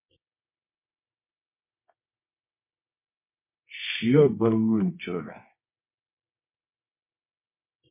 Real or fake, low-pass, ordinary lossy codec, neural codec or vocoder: fake; 3.6 kHz; MP3, 24 kbps; codec, 24 kHz, 0.9 kbps, WavTokenizer, medium music audio release